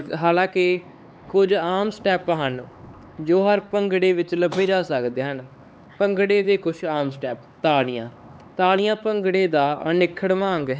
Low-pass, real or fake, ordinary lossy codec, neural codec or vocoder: none; fake; none; codec, 16 kHz, 4 kbps, X-Codec, HuBERT features, trained on LibriSpeech